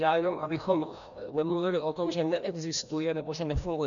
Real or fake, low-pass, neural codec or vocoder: fake; 7.2 kHz; codec, 16 kHz, 1 kbps, FreqCodec, larger model